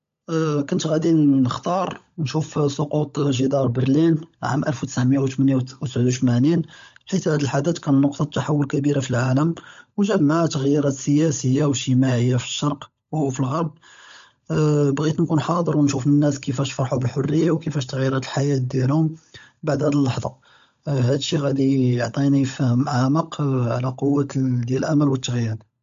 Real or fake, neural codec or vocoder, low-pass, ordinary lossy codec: fake; codec, 16 kHz, 16 kbps, FunCodec, trained on LibriTTS, 50 frames a second; 7.2 kHz; MP3, 48 kbps